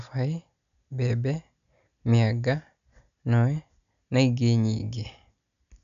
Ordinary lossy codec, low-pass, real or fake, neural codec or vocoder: none; 7.2 kHz; real; none